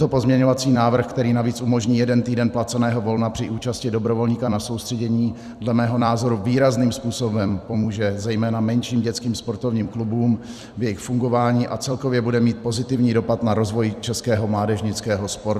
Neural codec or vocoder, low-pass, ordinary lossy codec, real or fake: vocoder, 44.1 kHz, 128 mel bands every 256 samples, BigVGAN v2; 14.4 kHz; Opus, 64 kbps; fake